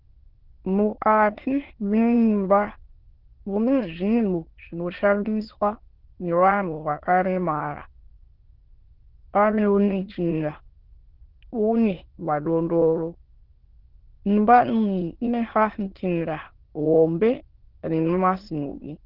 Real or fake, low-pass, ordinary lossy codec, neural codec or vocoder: fake; 5.4 kHz; Opus, 16 kbps; autoencoder, 22.05 kHz, a latent of 192 numbers a frame, VITS, trained on many speakers